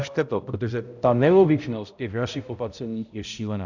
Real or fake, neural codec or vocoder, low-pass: fake; codec, 16 kHz, 0.5 kbps, X-Codec, HuBERT features, trained on balanced general audio; 7.2 kHz